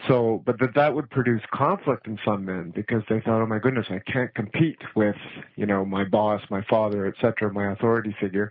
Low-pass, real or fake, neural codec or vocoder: 5.4 kHz; real; none